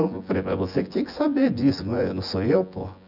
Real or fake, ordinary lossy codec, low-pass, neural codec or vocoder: fake; none; 5.4 kHz; vocoder, 24 kHz, 100 mel bands, Vocos